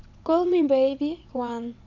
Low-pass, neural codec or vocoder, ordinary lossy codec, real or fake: 7.2 kHz; vocoder, 22.05 kHz, 80 mel bands, WaveNeXt; none; fake